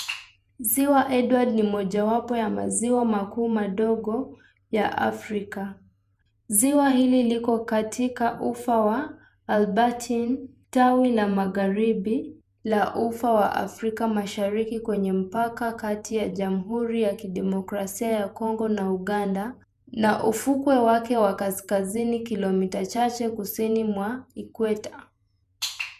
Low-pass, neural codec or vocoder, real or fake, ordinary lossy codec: 14.4 kHz; vocoder, 48 kHz, 128 mel bands, Vocos; fake; none